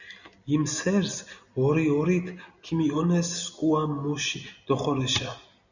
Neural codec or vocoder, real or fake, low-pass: none; real; 7.2 kHz